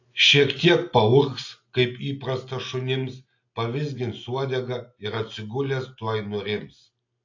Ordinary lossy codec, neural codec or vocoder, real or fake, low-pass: AAC, 48 kbps; none; real; 7.2 kHz